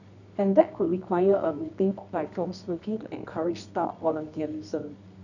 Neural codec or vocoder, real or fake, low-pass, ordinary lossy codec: codec, 24 kHz, 0.9 kbps, WavTokenizer, medium music audio release; fake; 7.2 kHz; none